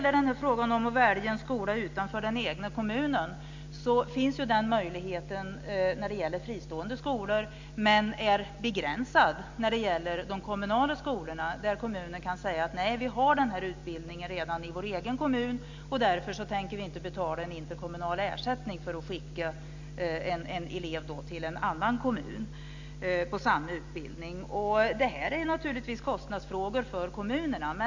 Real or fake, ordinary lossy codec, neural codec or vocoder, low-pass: real; MP3, 64 kbps; none; 7.2 kHz